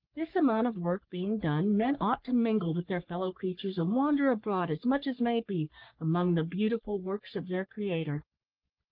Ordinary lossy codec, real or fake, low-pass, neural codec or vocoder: Opus, 32 kbps; fake; 5.4 kHz; codec, 44.1 kHz, 3.4 kbps, Pupu-Codec